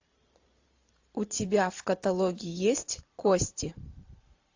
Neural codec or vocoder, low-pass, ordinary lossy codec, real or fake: vocoder, 44.1 kHz, 128 mel bands every 512 samples, BigVGAN v2; 7.2 kHz; AAC, 48 kbps; fake